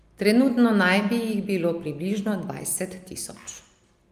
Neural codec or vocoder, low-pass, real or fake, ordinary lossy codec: none; 14.4 kHz; real; Opus, 32 kbps